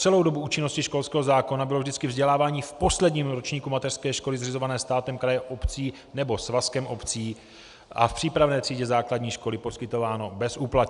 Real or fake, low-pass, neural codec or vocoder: real; 10.8 kHz; none